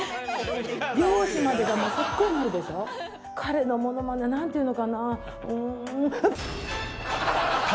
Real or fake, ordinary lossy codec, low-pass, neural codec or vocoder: real; none; none; none